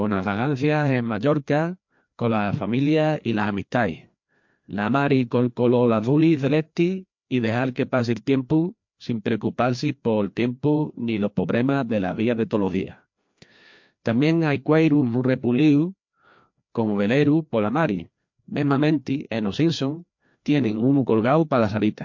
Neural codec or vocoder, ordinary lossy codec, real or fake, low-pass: codec, 16 kHz, 2 kbps, FreqCodec, larger model; MP3, 48 kbps; fake; 7.2 kHz